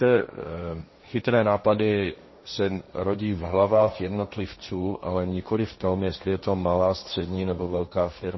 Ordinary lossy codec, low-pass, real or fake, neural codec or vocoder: MP3, 24 kbps; 7.2 kHz; fake; codec, 16 kHz, 1.1 kbps, Voila-Tokenizer